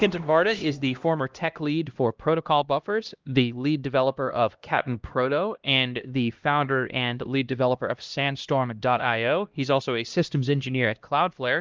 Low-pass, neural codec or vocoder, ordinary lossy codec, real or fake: 7.2 kHz; codec, 16 kHz, 1 kbps, X-Codec, HuBERT features, trained on LibriSpeech; Opus, 32 kbps; fake